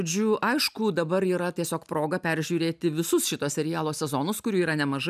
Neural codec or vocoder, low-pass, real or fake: none; 14.4 kHz; real